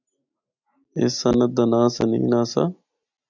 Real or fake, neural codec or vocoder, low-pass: real; none; 7.2 kHz